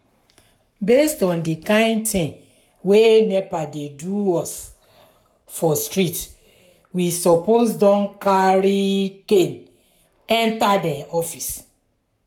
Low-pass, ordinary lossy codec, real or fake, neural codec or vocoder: 19.8 kHz; none; fake; codec, 44.1 kHz, 7.8 kbps, Pupu-Codec